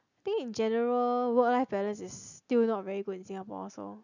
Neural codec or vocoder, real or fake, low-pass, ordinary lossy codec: none; real; 7.2 kHz; none